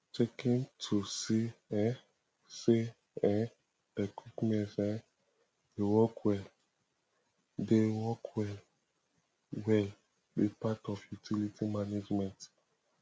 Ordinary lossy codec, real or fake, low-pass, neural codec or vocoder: none; real; none; none